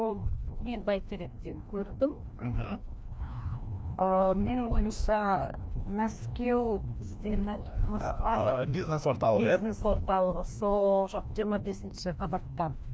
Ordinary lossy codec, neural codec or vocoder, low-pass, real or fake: none; codec, 16 kHz, 1 kbps, FreqCodec, larger model; none; fake